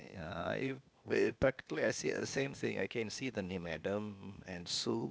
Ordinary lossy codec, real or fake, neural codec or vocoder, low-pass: none; fake; codec, 16 kHz, 0.8 kbps, ZipCodec; none